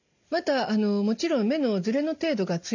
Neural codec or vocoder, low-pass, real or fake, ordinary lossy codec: none; 7.2 kHz; real; none